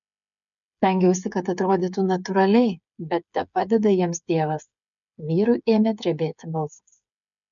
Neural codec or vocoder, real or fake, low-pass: codec, 16 kHz, 8 kbps, FreqCodec, smaller model; fake; 7.2 kHz